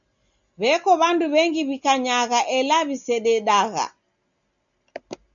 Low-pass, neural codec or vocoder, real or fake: 7.2 kHz; none; real